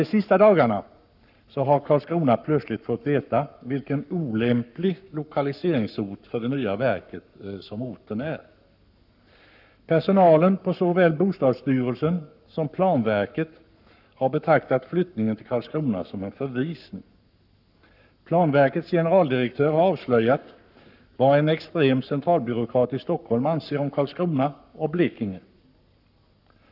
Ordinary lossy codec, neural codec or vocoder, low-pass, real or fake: none; codec, 44.1 kHz, 7.8 kbps, Pupu-Codec; 5.4 kHz; fake